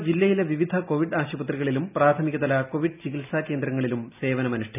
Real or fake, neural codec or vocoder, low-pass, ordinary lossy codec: real; none; 3.6 kHz; none